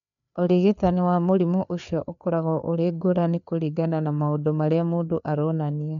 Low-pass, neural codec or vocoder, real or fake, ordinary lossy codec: 7.2 kHz; codec, 16 kHz, 4 kbps, FreqCodec, larger model; fake; none